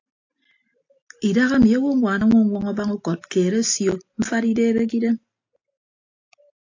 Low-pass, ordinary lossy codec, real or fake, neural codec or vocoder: 7.2 kHz; AAC, 48 kbps; real; none